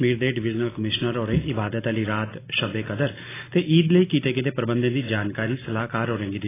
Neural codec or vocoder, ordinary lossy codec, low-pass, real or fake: none; AAC, 16 kbps; 3.6 kHz; real